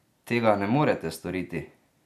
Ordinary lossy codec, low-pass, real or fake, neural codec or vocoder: none; 14.4 kHz; real; none